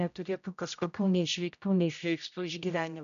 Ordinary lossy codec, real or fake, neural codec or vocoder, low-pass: AAC, 96 kbps; fake; codec, 16 kHz, 0.5 kbps, X-Codec, HuBERT features, trained on general audio; 7.2 kHz